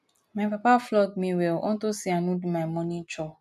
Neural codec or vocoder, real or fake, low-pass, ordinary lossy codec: none; real; 14.4 kHz; none